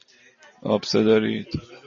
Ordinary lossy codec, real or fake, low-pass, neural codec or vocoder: MP3, 32 kbps; real; 7.2 kHz; none